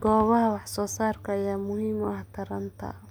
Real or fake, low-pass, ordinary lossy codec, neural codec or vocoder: real; none; none; none